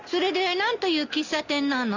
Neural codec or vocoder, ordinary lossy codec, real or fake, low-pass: none; none; real; 7.2 kHz